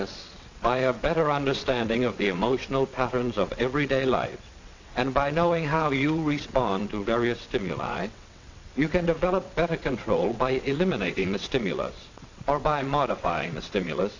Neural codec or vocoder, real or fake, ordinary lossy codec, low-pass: vocoder, 22.05 kHz, 80 mel bands, WaveNeXt; fake; AAC, 48 kbps; 7.2 kHz